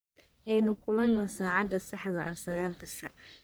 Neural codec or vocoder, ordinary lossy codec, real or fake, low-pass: codec, 44.1 kHz, 1.7 kbps, Pupu-Codec; none; fake; none